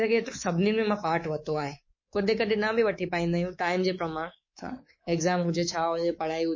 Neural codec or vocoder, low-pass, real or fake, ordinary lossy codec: codec, 24 kHz, 3.1 kbps, DualCodec; 7.2 kHz; fake; MP3, 32 kbps